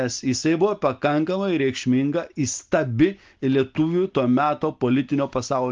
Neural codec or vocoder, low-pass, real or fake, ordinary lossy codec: none; 7.2 kHz; real; Opus, 32 kbps